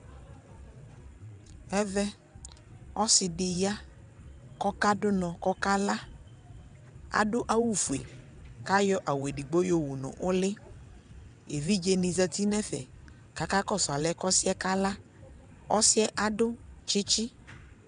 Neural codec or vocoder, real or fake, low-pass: vocoder, 22.05 kHz, 80 mel bands, WaveNeXt; fake; 9.9 kHz